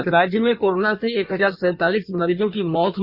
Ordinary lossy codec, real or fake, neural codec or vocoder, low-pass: Opus, 64 kbps; fake; codec, 16 kHz in and 24 kHz out, 1.1 kbps, FireRedTTS-2 codec; 5.4 kHz